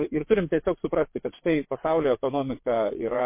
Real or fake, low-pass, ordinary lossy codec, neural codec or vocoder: fake; 3.6 kHz; MP3, 24 kbps; vocoder, 22.05 kHz, 80 mel bands, WaveNeXt